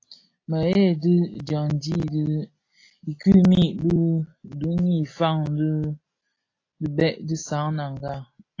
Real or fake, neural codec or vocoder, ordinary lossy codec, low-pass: real; none; AAC, 32 kbps; 7.2 kHz